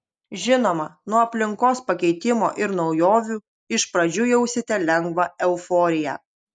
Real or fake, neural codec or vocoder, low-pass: real; none; 9.9 kHz